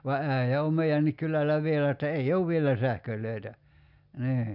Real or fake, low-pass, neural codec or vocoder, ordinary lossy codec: real; 5.4 kHz; none; none